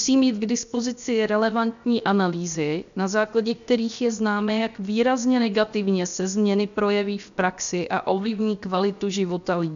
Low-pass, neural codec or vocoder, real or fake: 7.2 kHz; codec, 16 kHz, 0.7 kbps, FocalCodec; fake